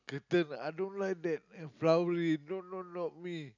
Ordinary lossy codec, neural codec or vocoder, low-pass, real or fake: none; none; 7.2 kHz; real